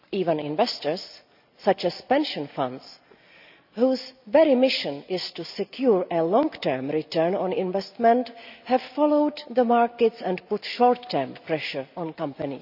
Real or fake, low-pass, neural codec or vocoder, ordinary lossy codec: real; 5.4 kHz; none; none